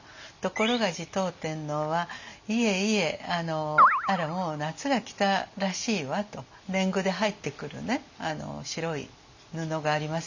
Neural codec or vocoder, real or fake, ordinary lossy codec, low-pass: none; real; none; 7.2 kHz